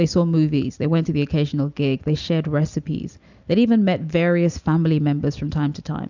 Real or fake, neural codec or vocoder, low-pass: real; none; 7.2 kHz